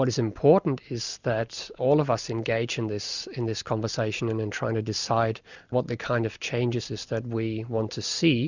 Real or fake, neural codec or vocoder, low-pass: real; none; 7.2 kHz